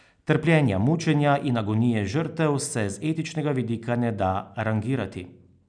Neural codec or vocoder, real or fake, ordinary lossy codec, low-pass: none; real; none; 9.9 kHz